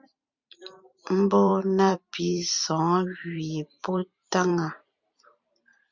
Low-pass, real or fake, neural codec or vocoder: 7.2 kHz; fake; vocoder, 44.1 kHz, 128 mel bands every 512 samples, BigVGAN v2